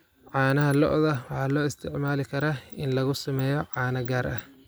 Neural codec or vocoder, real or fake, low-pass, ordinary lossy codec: none; real; none; none